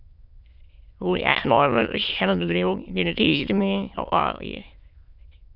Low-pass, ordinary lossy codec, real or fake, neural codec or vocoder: 5.4 kHz; Opus, 64 kbps; fake; autoencoder, 22.05 kHz, a latent of 192 numbers a frame, VITS, trained on many speakers